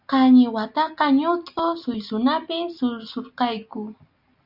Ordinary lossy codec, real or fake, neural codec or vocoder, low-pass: Opus, 64 kbps; real; none; 5.4 kHz